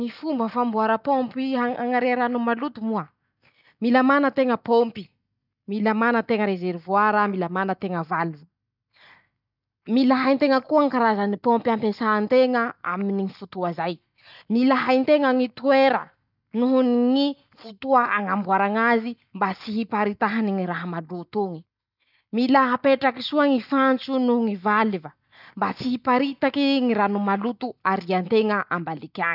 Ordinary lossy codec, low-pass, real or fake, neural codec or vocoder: none; 5.4 kHz; real; none